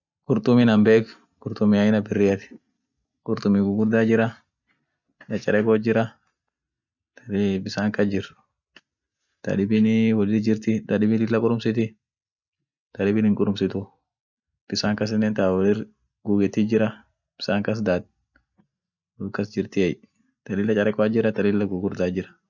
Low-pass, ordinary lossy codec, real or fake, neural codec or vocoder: 7.2 kHz; none; real; none